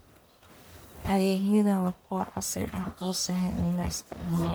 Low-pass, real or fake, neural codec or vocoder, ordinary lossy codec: none; fake; codec, 44.1 kHz, 1.7 kbps, Pupu-Codec; none